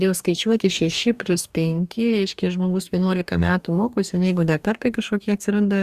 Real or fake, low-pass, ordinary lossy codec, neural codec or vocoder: fake; 14.4 kHz; Opus, 64 kbps; codec, 44.1 kHz, 2.6 kbps, DAC